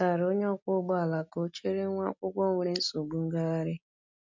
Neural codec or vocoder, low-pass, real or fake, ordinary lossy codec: none; 7.2 kHz; real; MP3, 64 kbps